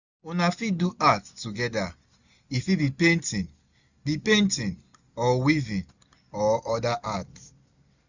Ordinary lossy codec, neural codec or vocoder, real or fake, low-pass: none; none; real; 7.2 kHz